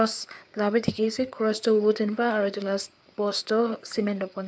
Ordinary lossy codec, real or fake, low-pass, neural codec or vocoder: none; fake; none; codec, 16 kHz, 4 kbps, FreqCodec, larger model